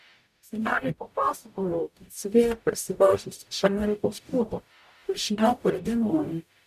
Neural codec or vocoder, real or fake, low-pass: codec, 44.1 kHz, 0.9 kbps, DAC; fake; 14.4 kHz